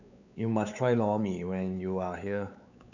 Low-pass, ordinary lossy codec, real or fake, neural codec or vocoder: 7.2 kHz; none; fake; codec, 16 kHz, 4 kbps, X-Codec, WavLM features, trained on Multilingual LibriSpeech